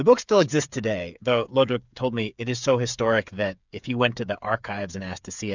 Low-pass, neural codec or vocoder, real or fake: 7.2 kHz; codec, 16 kHz, 8 kbps, FreqCodec, smaller model; fake